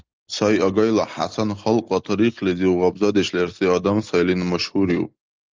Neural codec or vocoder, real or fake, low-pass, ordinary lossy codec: none; real; 7.2 kHz; Opus, 32 kbps